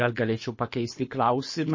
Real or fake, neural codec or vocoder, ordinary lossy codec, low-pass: fake; codec, 24 kHz, 6 kbps, HILCodec; MP3, 32 kbps; 7.2 kHz